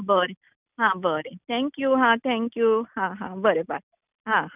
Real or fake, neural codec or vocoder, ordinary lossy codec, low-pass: real; none; none; 3.6 kHz